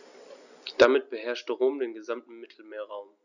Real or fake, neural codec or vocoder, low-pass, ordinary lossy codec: real; none; 7.2 kHz; none